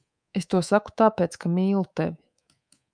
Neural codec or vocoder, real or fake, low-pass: codec, 24 kHz, 3.1 kbps, DualCodec; fake; 9.9 kHz